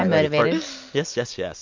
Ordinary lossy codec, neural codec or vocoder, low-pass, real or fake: MP3, 48 kbps; none; 7.2 kHz; real